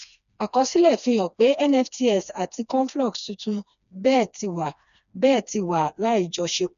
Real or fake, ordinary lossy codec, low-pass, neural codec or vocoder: fake; none; 7.2 kHz; codec, 16 kHz, 2 kbps, FreqCodec, smaller model